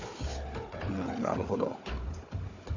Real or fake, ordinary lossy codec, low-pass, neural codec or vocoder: fake; AAC, 48 kbps; 7.2 kHz; codec, 16 kHz, 4 kbps, FunCodec, trained on Chinese and English, 50 frames a second